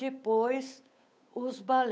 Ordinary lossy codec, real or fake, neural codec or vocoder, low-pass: none; fake; codec, 16 kHz, 8 kbps, FunCodec, trained on Chinese and English, 25 frames a second; none